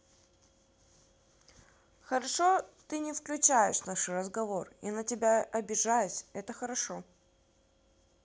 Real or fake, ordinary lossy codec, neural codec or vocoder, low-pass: real; none; none; none